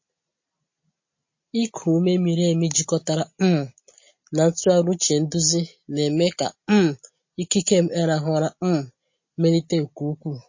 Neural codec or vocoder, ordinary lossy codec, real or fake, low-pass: none; MP3, 32 kbps; real; 7.2 kHz